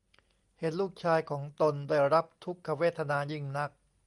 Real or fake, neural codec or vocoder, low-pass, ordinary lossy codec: real; none; 10.8 kHz; Opus, 32 kbps